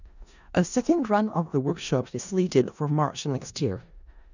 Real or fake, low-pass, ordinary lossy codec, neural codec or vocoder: fake; 7.2 kHz; none; codec, 16 kHz in and 24 kHz out, 0.4 kbps, LongCat-Audio-Codec, four codebook decoder